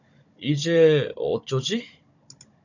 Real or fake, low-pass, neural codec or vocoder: fake; 7.2 kHz; codec, 16 kHz, 16 kbps, FunCodec, trained on Chinese and English, 50 frames a second